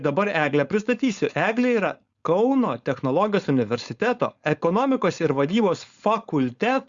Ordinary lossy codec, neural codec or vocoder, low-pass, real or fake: Opus, 64 kbps; codec, 16 kHz, 4.8 kbps, FACodec; 7.2 kHz; fake